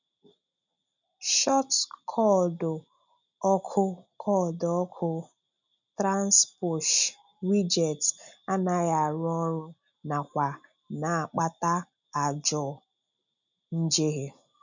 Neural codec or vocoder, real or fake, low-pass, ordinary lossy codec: none; real; 7.2 kHz; none